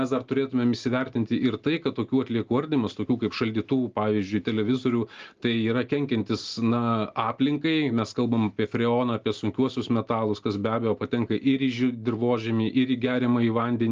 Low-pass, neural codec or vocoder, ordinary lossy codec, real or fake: 7.2 kHz; none; Opus, 32 kbps; real